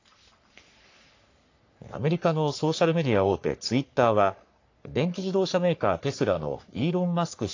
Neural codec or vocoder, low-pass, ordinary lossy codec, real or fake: codec, 44.1 kHz, 3.4 kbps, Pupu-Codec; 7.2 kHz; AAC, 48 kbps; fake